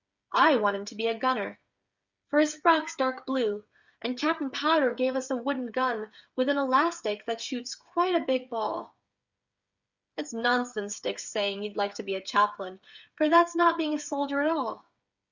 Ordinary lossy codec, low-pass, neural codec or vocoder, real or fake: Opus, 64 kbps; 7.2 kHz; codec, 16 kHz, 8 kbps, FreqCodec, smaller model; fake